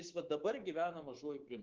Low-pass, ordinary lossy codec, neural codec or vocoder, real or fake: 7.2 kHz; Opus, 16 kbps; codec, 24 kHz, 3.1 kbps, DualCodec; fake